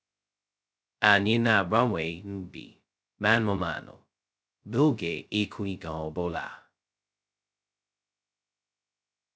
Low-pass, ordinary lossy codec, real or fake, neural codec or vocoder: none; none; fake; codec, 16 kHz, 0.2 kbps, FocalCodec